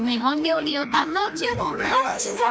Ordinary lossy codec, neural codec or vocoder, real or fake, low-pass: none; codec, 16 kHz, 1 kbps, FreqCodec, larger model; fake; none